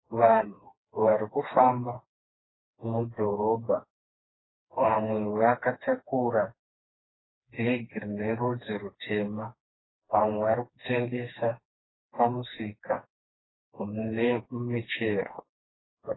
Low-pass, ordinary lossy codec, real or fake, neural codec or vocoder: 7.2 kHz; AAC, 16 kbps; fake; codec, 16 kHz, 2 kbps, FreqCodec, smaller model